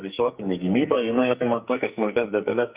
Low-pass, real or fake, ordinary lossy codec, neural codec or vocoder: 3.6 kHz; fake; Opus, 64 kbps; codec, 44.1 kHz, 2.6 kbps, SNAC